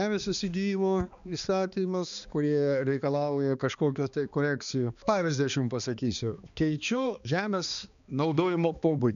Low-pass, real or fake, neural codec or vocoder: 7.2 kHz; fake; codec, 16 kHz, 2 kbps, X-Codec, HuBERT features, trained on balanced general audio